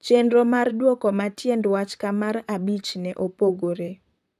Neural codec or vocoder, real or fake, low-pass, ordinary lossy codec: vocoder, 44.1 kHz, 128 mel bands, Pupu-Vocoder; fake; 14.4 kHz; none